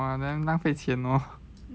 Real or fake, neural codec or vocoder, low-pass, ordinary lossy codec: real; none; none; none